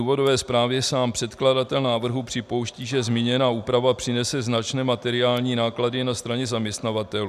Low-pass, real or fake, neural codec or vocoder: 14.4 kHz; real; none